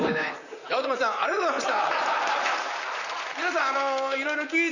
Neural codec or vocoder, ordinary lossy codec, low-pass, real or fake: vocoder, 44.1 kHz, 128 mel bands, Pupu-Vocoder; none; 7.2 kHz; fake